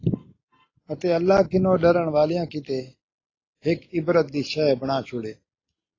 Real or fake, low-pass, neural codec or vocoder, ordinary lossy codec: real; 7.2 kHz; none; AAC, 32 kbps